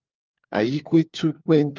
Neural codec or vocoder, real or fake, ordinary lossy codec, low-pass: codec, 16 kHz, 4 kbps, FunCodec, trained on LibriTTS, 50 frames a second; fake; Opus, 32 kbps; 7.2 kHz